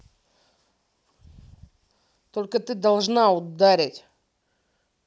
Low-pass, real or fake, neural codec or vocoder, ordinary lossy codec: none; real; none; none